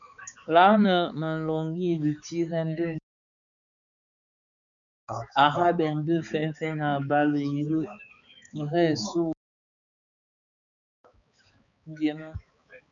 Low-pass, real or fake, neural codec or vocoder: 7.2 kHz; fake; codec, 16 kHz, 4 kbps, X-Codec, HuBERT features, trained on balanced general audio